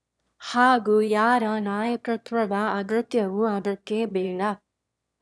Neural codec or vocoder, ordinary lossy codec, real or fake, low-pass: autoencoder, 22.05 kHz, a latent of 192 numbers a frame, VITS, trained on one speaker; none; fake; none